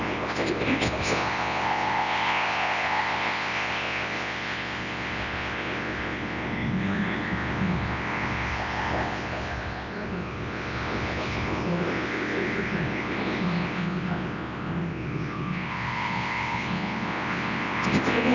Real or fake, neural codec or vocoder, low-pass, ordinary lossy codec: fake; codec, 24 kHz, 0.9 kbps, WavTokenizer, large speech release; 7.2 kHz; Opus, 64 kbps